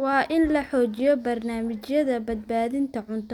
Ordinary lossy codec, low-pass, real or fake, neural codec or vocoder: none; 19.8 kHz; real; none